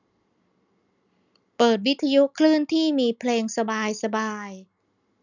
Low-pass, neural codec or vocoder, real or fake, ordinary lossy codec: 7.2 kHz; none; real; none